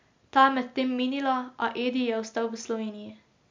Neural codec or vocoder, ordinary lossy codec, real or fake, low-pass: none; MP3, 64 kbps; real; 7.2 kHz